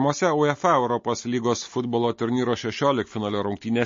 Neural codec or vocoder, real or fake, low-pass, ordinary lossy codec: none; real; 7.2 kHz; MP3, 32 kbps